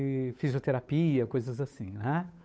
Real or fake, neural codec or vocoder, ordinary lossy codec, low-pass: fake; codec, 16 kHz, 8 kbps, FunCodec, trained on Chinese and English, 25 frames a second; none; none